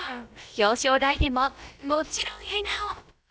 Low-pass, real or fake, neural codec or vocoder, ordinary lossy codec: none; fake; codec, 16 kHz, about 1 kbps, DyCAST, with the encoder's durations; none